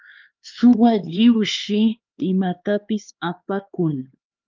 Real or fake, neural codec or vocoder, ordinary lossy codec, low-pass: fake; codec, 16 kHz, 4 kbps, X-Codec, HuBERT features, trained on LibriSpeech; Opus, 24 kbps; 7.2 kHz